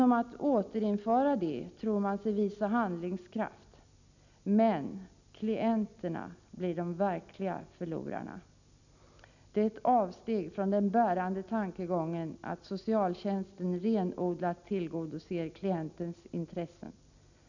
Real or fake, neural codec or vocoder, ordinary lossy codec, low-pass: real; none; none; 7.2 kHz